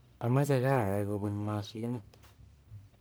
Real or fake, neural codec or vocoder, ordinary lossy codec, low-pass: fake; codec, 44.1 kHz, 1.7 kbps, Pupu-Codec; none; none